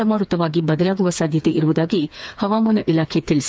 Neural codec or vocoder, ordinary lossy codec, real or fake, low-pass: codec, 16 kHz, 4 kbps, FreqCodec, smaller model; none; fake; none